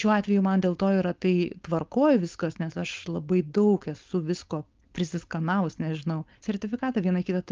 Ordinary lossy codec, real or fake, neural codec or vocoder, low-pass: Opus, 24 kbps; fake; codec, 16 kHz, 4.8 kbps, FACodec; 7.2 kHz